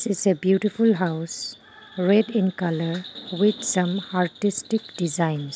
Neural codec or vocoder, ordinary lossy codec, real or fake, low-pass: none; none; real; none